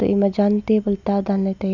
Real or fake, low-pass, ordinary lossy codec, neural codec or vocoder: real; 7.2 kHz; none; none